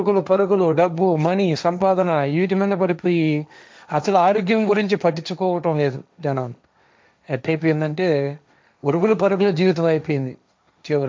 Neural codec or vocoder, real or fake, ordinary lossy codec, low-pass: codec, 16 kHz, 1.1 kbps, Voila-Tokenizer; fake; none; none